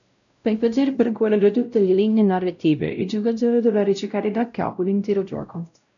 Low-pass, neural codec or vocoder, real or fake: 7.2 kHz; codec, 16 kHz, 0.5 kbps, X-Codec, WavLM features, trained on Multilingual LibriSpeech; fake